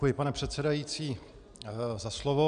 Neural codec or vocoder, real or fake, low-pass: none; real; 9.9 kHz